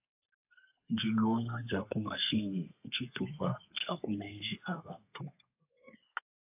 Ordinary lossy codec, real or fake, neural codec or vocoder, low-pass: AAC, 32 kbps; fake; codec, 32 kHz, 1.9 kbps, SNAC; 3.6 kHz